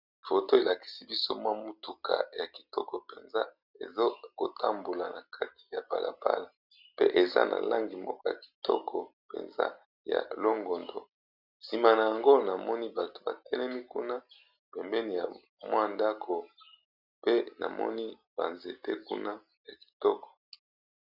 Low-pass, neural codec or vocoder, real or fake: 5.4 kHz; none; real